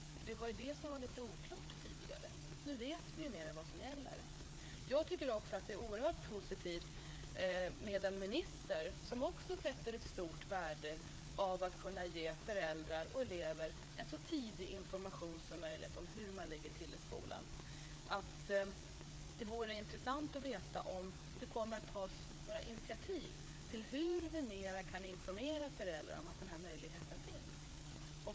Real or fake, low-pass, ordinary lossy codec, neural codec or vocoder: fake; none; none; codec, 16 kHz, 4 kbps, FreqCodec, larger model